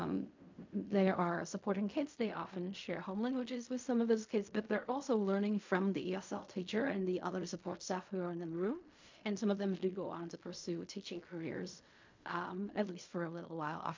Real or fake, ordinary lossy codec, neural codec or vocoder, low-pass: fake; MP3, 48 kbps; codec, 16 kHz in and 24 kHz out, 0.4 kbps, LongCat-Audio-Codec, fine tuned four codebook decoder; 7.2 kHz